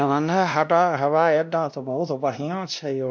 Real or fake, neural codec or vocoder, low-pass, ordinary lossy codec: fake; codec, 16 kHz, 1 kbps, X-Codec, WavLM features, trained on Multilingual LibriSpeech; none; none